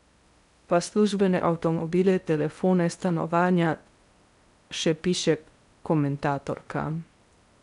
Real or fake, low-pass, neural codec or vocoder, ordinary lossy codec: fake; 10.8 kHz; codec, 16 kHz in and 24 kHz out, 0.8 kbps, FocalCodec, streaming, 65536 codes; none